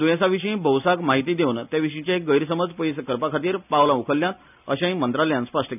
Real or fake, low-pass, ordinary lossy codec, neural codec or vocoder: real; 3.6 kHz; none; none